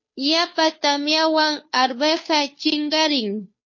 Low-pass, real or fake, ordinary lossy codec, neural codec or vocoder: 7.2 kHz; fake; MP3, 32 kbps; codec, 16 kHz, 2 kbps, FunCodec, trained on Chinese and English, 25 frames a second